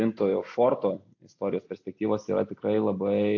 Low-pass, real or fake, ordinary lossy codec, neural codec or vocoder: 7.2 kHz; real; AAC, 48 kbps; none